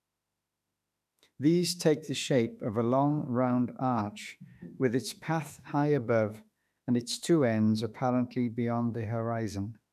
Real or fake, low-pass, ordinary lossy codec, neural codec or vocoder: fake; 14.4 kHz; none; autoencoder, 48 kHz, 32 numbers a frame, DAC-VAE, trained on Japanese speech